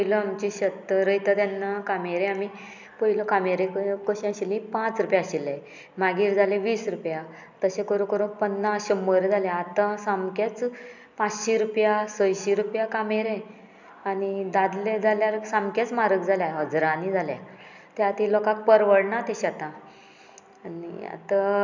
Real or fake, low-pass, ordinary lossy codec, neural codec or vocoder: real; 7.2 kHz; none; none